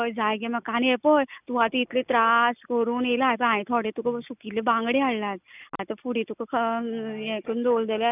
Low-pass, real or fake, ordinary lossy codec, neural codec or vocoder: 3.6 kHz; real; none; none